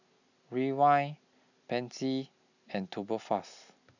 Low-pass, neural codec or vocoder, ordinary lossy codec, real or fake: 7.2 kHz; none; none; real